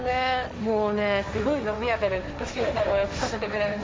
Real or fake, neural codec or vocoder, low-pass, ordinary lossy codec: fake; codec, 16 kHz, 1.1 kbps, Voila-Tokenizer; none; none